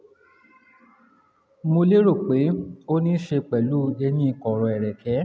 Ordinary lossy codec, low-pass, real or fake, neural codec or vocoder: none; none; real; none